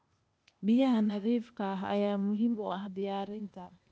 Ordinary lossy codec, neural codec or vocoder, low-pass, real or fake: none; codec, 16 kHz, 0.8 kbps, ZipCodec; none; fake